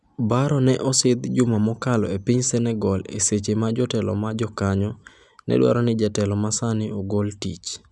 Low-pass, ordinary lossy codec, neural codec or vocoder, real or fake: none; none; none; real